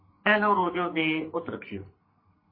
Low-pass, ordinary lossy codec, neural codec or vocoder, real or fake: 5.4 kHz; MP3, 24 kbps; codec, 44.1 kHz, 2.6 kbps, SNAC; fake